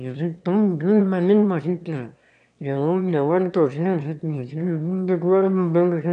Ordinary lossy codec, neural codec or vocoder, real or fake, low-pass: none; autoencoder, 22.05 kHz, a latent of 192 numbers a frame, VITS, trained on one speaker; fake; 9.9 kHz